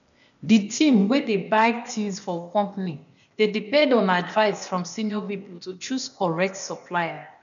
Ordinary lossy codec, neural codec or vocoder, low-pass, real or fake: none; codec, 16 kHz, 0.8 kbps, ZipCodec; 7.2 kHz; fake